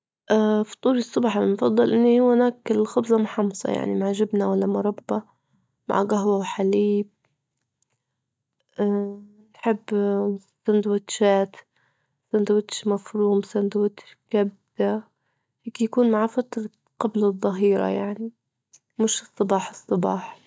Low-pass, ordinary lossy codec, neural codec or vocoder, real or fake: none; none; none; real